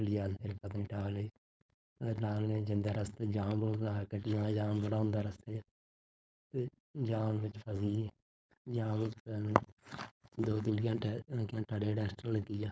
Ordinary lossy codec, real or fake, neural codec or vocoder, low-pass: none; fake; codec, 16 kHz, 4.8 kbps, FACodec; none